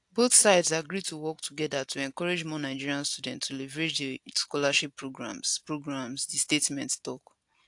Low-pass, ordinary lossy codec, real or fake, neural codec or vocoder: 10.8 kHz; AAC, 64 kbps; real; none